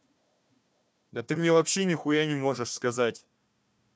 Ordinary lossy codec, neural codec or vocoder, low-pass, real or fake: none; codec, 16 kHz, 1 kbps, FunCodec, trained on Chinese and English, 50 frames a second; none; fake